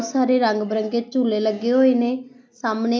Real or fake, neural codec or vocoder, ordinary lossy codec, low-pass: real; none; none; none